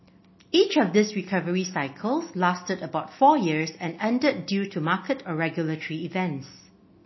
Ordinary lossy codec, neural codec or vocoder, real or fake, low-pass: MP3, 24 kbps; none; real; 7.2 kHz